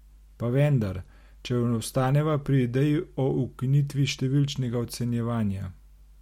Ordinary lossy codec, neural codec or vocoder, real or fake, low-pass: MP3, 64 kbps; none; real; 19.8 kHz